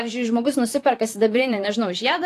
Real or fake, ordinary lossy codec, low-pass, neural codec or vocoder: fake; AAC, 64 kbps; 14.4 kHz; vocoder, 44.1 kHz, 128 mel bands, Pupu-Vocoder